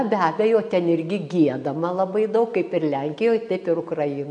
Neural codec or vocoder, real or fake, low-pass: none; real; 9.9 kHz